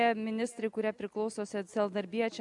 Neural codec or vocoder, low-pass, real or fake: none; 10.8 kHz; real